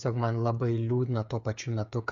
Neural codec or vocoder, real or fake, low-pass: codec, 16 kHz, 8 kbps, FreqCodec, smaller model; fake; 7.2 kHz